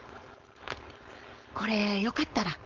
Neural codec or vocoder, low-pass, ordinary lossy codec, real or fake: codec, 16 kHz, 4.8 kbps, FACodec; 7.2 kHz; Opus, 32 kbps; fake